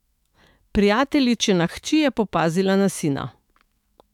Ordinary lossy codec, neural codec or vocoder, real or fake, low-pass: none; autoencoder, 48 kHz, 128 numbers a frame, DAC-VAE, trained on Japanese speech; fake; 19.8 kHz